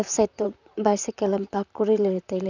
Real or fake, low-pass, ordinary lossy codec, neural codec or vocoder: fake; 7.2 kHz; none; vocoder, 44.1 kHz, 128 mel bands, Pupu-Vocoder